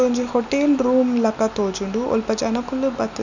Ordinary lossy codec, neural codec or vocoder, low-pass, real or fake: none; none; 7.2 kHz; real